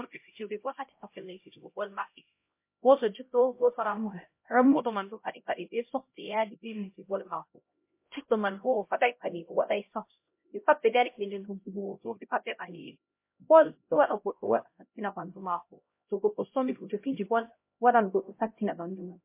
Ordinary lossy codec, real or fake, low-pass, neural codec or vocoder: MP3, 24 kbps; fake; 3.6 kHz; codec, 16 kHz, 0.5 kbps, X-Codec, HuBERT features, trained on LibriSpeech